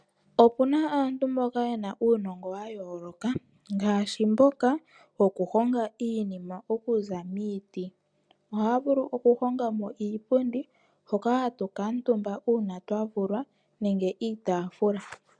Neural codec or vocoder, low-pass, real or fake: none; 9.9 kHz; real